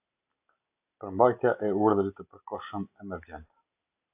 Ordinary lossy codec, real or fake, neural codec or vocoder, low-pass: AAC, 32 kbps; real; none; 3.6 kHz